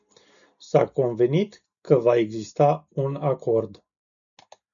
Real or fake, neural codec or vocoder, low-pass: real; none; 7.2 kHz